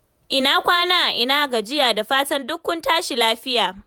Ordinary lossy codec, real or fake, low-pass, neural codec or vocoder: none; fake; none; vocoder, 48 kHz, 128 mel bands, Vocos